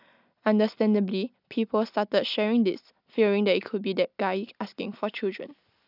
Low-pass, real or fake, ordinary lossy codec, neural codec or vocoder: 5.4 kHz; real; none; none